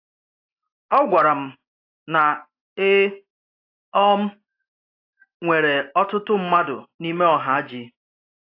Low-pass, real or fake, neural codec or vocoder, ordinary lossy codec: 5.4 kHz; real; none; AAC, 32 kbps